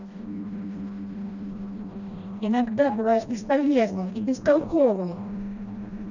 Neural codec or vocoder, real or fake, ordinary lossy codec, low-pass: codec, 16 kHz, 1 kbps, FreqCodec, smaller model; fake; none; 7.2 kHz